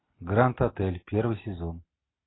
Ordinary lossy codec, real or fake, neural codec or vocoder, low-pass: AAC, 16 kbps; real; none; 7.2 kHz